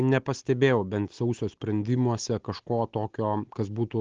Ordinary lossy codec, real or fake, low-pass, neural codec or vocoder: Opus, 16 kbps; real; 7.2 kHz; none